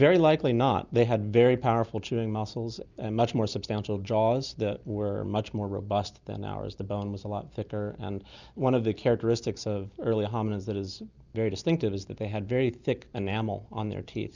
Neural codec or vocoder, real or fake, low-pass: none; real; 7.2 kHz